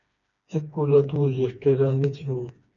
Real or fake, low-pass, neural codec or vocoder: fake; 7.2 kHz; codec, 16 kHz, 2 kbps, FreqCodec, smaller model